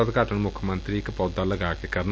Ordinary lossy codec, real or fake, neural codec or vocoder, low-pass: none; real; none; none